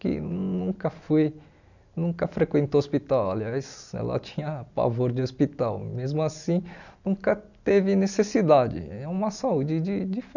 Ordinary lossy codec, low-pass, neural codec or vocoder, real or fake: none; 7.2 kHz; none; real